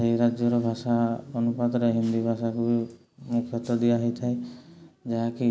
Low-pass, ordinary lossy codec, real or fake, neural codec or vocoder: none; none; real; none